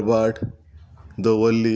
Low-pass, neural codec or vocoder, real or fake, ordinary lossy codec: none; none; real; none